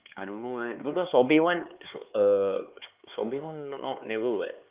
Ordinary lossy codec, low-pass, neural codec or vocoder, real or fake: Opus, 24 kbps; 3.6 kHz; codec, 16 kHz, 4 kbps, X-Codec, HuBERT features, trained on LibriSpeech; fake